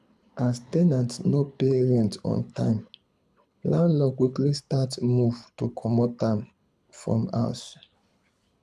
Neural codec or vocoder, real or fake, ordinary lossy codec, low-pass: codec, 24 kHz, 6 kbps, HILCodec; fake; none; none